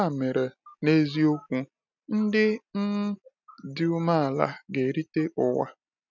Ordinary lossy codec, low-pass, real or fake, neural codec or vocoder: none; 7.2 kHz; real; none